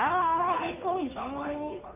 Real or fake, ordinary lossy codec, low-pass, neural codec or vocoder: fake; none; 3.6 kHz; codec, 16 kHz, 2 kbps, FunCodec, trained on Chinese and English, 25 frames a second